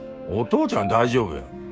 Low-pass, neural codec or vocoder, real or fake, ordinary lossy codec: none; codec, 16 kHz, 6 kbps, DAC; fake; none